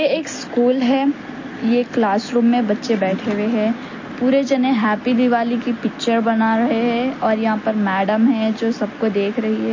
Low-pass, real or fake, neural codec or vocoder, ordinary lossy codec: 7.2 kHz; real; none; MP3, 32 kbps